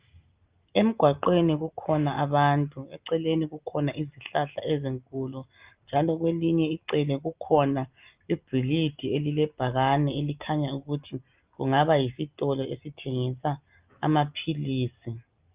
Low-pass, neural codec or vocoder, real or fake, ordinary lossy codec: 3.6 kHz; none; real; Opus, 24 kbps